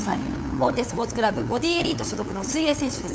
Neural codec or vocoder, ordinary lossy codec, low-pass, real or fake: codec, 16 kHz, 8 kbps, FunCodec, trained on LibriTTS, 25 frames a second; none; none; fake